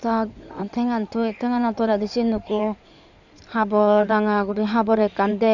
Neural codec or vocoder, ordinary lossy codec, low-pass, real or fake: codec, 16 kHz in and 24 kHz out, 2.2 kbps, FireRedTTS-2 codec; none; 7.2 kHz; fake